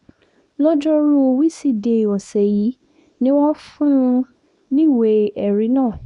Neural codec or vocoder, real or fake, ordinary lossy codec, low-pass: codec, 24 kHz, 0.9 kbps, WavTokenizer, small release; fake; none; 10.8 kHz